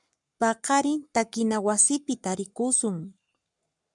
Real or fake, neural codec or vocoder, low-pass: fake; codec, 44.1 kHz, 7.8 kbps, Pupu-Codec; 10.8 kHz